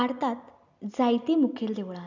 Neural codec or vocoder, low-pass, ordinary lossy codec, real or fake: none; 7.2 kHz; none; real